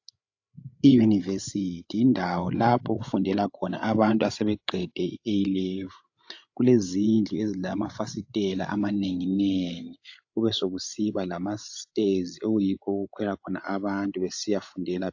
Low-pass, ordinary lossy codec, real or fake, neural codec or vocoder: 7.2 kHz; AAC, 48 kbps; fake; codec, 16 kHz, 8 kbps, FreqCodec, larger model